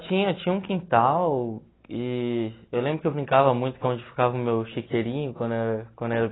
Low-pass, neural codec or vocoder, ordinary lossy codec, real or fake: 7.2 kHz; none; AAC, 16 kbps; real